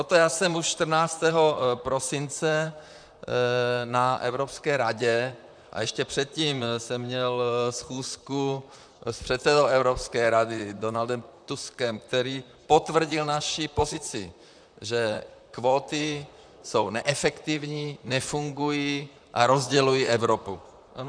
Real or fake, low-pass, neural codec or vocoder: fake; 9.9 kHz; vocoder, 44.1 kHz, 128 mel bands, Pupu-Vocoder